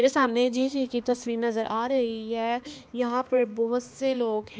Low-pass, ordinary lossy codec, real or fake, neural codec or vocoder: none; none; fake; codec, 16 kHz, 2 kbps, X-Codec, HuBERT features, trained on balanced general audio